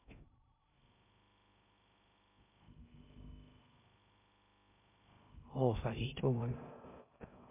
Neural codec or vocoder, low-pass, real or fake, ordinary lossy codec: codec, 16 kHz in and 24 kHz out, 0.6 kbps, FocalCodec, streaming, 2048 codes; 3.6 kHz; fake; MP3, 32 kbps